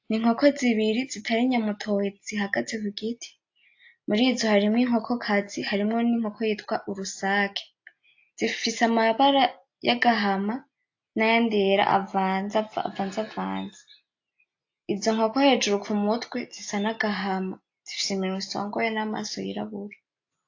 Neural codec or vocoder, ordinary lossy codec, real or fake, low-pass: none; AAC, 48 kbps; real; 7.2 kHz